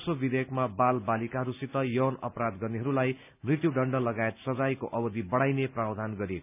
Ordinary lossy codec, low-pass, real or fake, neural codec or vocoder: none; 3.6 kHz; real; none